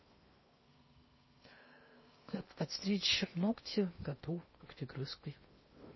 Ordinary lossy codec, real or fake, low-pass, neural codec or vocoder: MP3, 24 kbps; fake; 7.2 kHz; codec, 16 kHz in and 24 kHz out, 0.6 kbps, FocalCodec, streaming, 2048 codes